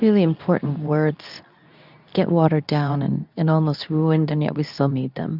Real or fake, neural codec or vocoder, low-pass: fake; codec, 24 kHz, 0.9 kbps, WavTokenizer, medium speech release version 2; 5.4 kHz